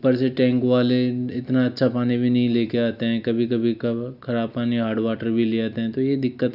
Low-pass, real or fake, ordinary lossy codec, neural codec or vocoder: 5.4 kHz; real; none; none